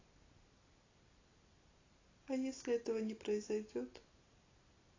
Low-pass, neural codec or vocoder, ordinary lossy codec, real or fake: 7.2 kHz; none; AAC, 32 kbps; real